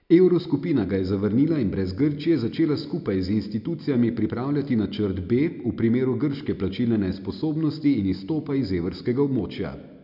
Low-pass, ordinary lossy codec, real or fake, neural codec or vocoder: 5.4 kHz; none; real; none